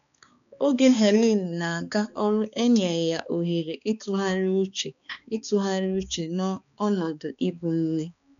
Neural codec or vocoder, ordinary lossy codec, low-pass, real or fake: codec, 16 kHz, 2 kbps, X-Codec, HuBERT features, trained on balanced general audio; none; 7.2 kHz; fake